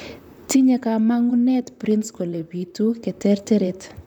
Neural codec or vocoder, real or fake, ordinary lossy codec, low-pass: vocoder, 44.1 kHz, 128 mel bands, Pupu-Vocoder; fake; none; 19.8 kHz